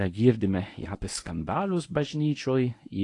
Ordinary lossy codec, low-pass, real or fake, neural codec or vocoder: AAC, 48 kbps; 10.8 kHz; fake; codec, 24 kHz, 0.9 kbps, WavTokenizer, medium speech release version 1